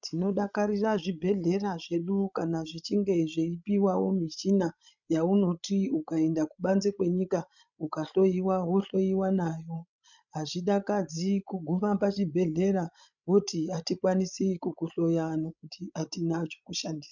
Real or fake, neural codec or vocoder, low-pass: fake; codec, 16 kHz, 16 kbps, FreqCodec, larger model; 7.2 kHz